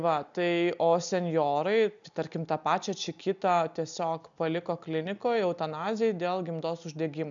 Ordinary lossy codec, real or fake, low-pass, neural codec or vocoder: MP3, 96 kbps; real; 7.2 kHz; none